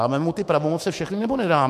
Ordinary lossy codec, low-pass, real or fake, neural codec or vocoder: MP3, 64 kbps; 14.4 kHz; fake; autoencoder, 48 kHz, 128 numbers a frame, DAC-VAE, trained on Japanese speech